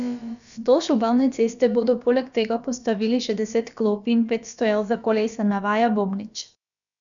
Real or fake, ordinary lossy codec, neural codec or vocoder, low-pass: fake; none; codec, 16 kHz, about 1 kbps, DyCAST, with the encoder's durations; 7.2 kHz